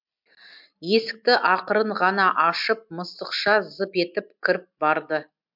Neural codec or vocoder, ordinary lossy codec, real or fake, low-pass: none; MP3, 48 kbps; real; 5.4 kHz